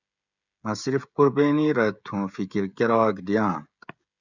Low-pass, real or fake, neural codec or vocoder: 7.2 kHz; fake; codec, 16 kHz, 16 kbps, FreqCodec, smaller model